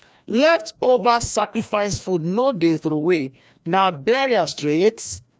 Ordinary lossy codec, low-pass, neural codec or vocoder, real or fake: none; none; codec, 16 kHz, 1 kbps, FreqCodec, larger model; fake